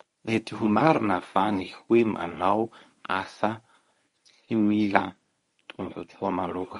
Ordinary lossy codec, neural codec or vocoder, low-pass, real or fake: MP3, 48 kbps; codec, 24 kHz, 0.9 kbps, WavTokenizer, medium speech release version 1; 10.8 kHz; fake